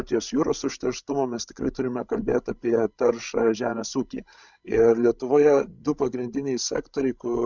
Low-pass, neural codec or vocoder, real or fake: 7.2 kHz; codec, 16 kHz, 16 kbps, FreqCodec, larger model; fake